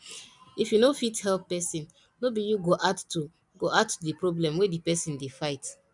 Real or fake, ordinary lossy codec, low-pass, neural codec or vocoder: real; none; 10.8 kHz; none